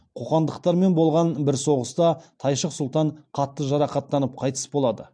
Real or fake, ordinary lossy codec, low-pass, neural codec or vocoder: real; AAC, 64 kbps; 9.9 kHz; none